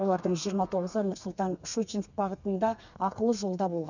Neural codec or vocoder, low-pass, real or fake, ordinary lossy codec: codec, 32 kHz, 1.9 kbps, SNAC; 7.2 kHz; fake; none